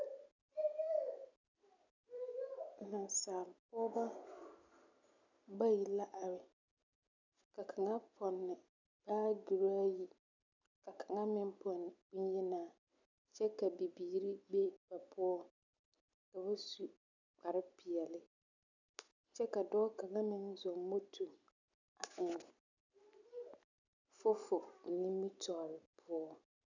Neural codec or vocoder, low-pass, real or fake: none; 7.2 kHz; real